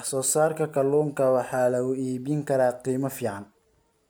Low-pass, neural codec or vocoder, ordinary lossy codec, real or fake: none; none; none; real